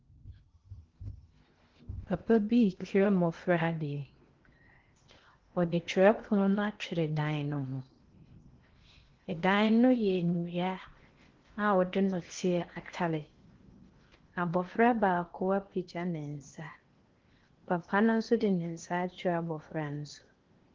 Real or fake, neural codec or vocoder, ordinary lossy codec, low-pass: fake; codec, 16 kHz in and 24 kHz out, 0.8 kbps, FocalCodec, streaming, 65536 codes; Opus, 16 kbps; 7.2 kHz